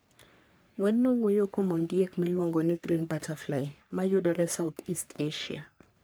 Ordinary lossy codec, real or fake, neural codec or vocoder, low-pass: none; fake; codec, 44.1 kHz, 3.4 kbps, Pupu-Codec; none